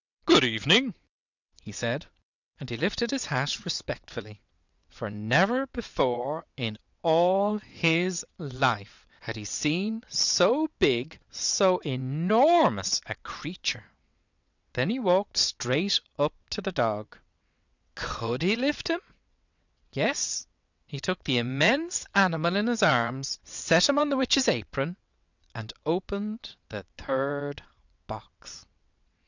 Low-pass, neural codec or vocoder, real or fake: 7.2 kHz; vocoder, 22.05 kHz, 80 mel bands, WaveNeXt; fake